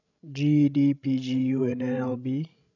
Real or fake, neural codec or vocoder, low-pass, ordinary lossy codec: fake; codec, 16 kHz, 16 kbps, FreqCodec, larger model; 7.2 kHz; none